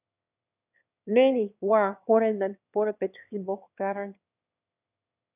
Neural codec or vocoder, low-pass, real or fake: autoencoder, 22.05 kHz, a latent of 192 numbers a frame, VITS, trained on one speaker; 3.6 kHz; fake